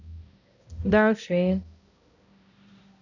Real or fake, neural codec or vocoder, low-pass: fake; codec, 16 kHz, 0.5 kbps, X-Codec, HuBERT features, trained on balanced general audio; 7.2 kHz